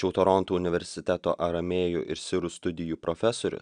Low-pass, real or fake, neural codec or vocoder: 9.9 kHz; real; none